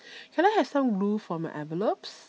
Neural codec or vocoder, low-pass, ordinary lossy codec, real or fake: none; none; none; real